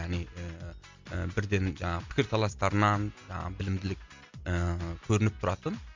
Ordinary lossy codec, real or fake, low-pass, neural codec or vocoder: MP3, 64 kbps; real; 7.2 kHz; none